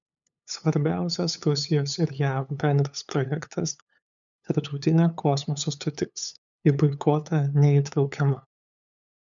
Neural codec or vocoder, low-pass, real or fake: codec, 16 kHz, 8 kbps, FunCodec, trained on LibriTTS, 25 frames a second; 7.2 kHz; fake